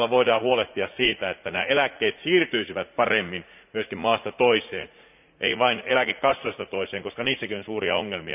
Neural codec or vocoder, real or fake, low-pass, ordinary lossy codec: vocoder, 44.1 kHz, 80 mel bands, Vocos; fake; 3.6 kHz; none